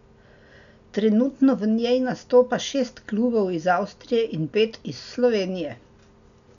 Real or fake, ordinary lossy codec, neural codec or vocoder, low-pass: real; none; none; 7.2 kHz